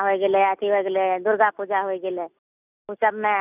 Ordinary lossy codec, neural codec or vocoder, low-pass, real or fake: none; none; 3.6 kHz; real